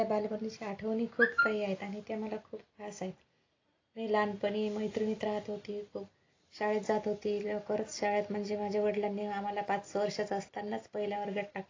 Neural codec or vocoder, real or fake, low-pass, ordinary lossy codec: none; real; 7.2 kHz; AAC, 32 kbps